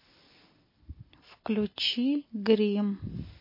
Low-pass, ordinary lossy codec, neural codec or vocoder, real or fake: 5.4 kHz; MP3, 24 kbps; none; real